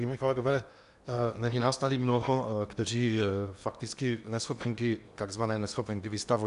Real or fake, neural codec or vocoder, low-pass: fake; codec, 16 kHz in and 24 kHz out, 0.8 kbps, FocalCodec, streaming, 65536 codes; 10.8 kHz